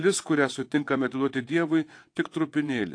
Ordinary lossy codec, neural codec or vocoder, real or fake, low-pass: AAC, 48 kbps; vocoder, 22.05 kHz, 80 mel bands, WaveNeXt; fake; 9.9 kHz